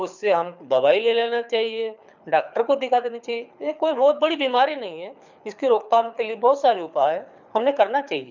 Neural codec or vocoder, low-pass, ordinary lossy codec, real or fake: codec, 24 kHz, 6 kbps, HILCodec; 7.2 kHz; none; fake